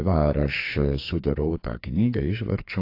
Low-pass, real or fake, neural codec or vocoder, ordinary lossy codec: 5.4 kHz; fake; codec, 44.1 kHz, 2.6 kbps, SNAC; AAC, 32 kbps